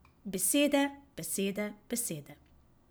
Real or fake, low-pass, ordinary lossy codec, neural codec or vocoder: real; none; none; none